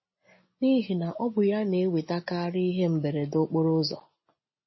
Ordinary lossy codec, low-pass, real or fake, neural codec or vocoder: MP3, 24 kbps; 7.2 kHz; real; none